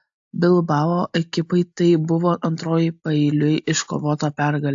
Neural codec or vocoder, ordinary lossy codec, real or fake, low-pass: none; AAC, 64 kbps; real; 7.2 kHz